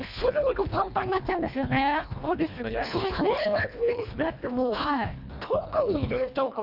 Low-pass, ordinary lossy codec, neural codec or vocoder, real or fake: 5.4 kHz; none; codec, 24 kHz, 1.5 kbps, HILCodec; fake